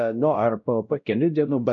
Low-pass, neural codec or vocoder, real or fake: 7.2 kHz; codec, 16 kHz, 0.5 kbps, X-Codec, WavLM features, trained on Multilingual LibriSpeech; fake